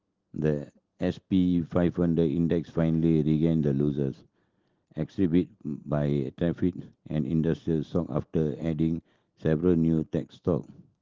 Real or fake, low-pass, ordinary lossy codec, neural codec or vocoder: real; 7.2 kHz; Opus, 16 kbps; none